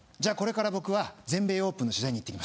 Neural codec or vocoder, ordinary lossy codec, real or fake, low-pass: none; none; real; none